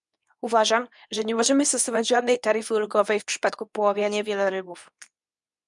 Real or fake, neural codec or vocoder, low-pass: fake; codec, 24 kHz, 0.9 kbps, WavTokenizer, medium speech release version 2; 10.8 kHz